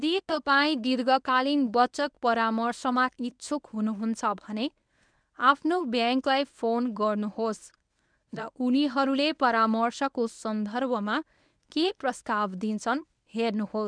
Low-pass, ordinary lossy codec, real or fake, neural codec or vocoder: 9.9 kHz; none; fake; codec, 24 kHz, 0.9 kbps, WavTokenizer, medium speech release version 1